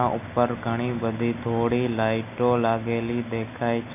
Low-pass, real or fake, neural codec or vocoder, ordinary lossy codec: 3.6 kHz; real; none; none